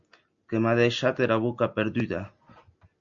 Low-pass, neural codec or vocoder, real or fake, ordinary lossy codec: 7.2 kHz; none; real; MP3, 64 kbps